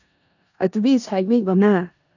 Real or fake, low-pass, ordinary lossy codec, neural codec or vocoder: fake; 7.2 kHz; none; codec, 16 kHz in and 24 kHz out, 0.4 kbps, LongCat-Audio-Codec, four codebook decoder